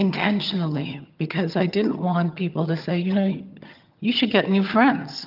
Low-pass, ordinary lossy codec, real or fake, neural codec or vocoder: 5.4 kHz; Opus, 24 kbps; fake; vocoder, 22.05 kHz, 80 mel bands, HiFi-GAN